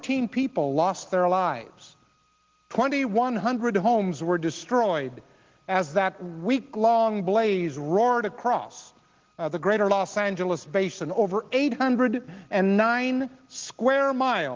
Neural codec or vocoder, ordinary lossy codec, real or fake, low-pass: none; Opus, 16 kbps; real; 7.2 kHz